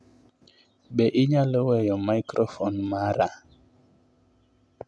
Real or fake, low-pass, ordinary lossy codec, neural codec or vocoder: real; none; none; none